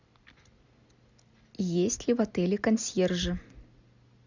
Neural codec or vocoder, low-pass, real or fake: none; 7.2 kHz; real